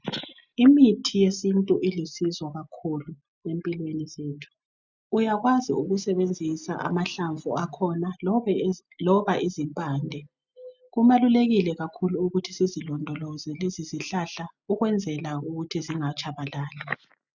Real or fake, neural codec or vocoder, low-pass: real; none; 7.2 kHz